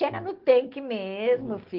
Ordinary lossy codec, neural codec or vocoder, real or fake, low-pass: Opus, 16 kbps; none; real; 5.4 kHz